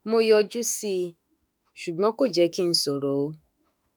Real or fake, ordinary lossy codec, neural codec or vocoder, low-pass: fake; none; autoencoder, 48 kHz, 32 numbers a frame, DAC-VAE, trained on Japanese speech; none